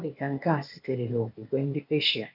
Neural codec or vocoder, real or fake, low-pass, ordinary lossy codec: codec, 16 kHz, 0.8 kbps, ZipCodec; fake; 5.4 kHz; none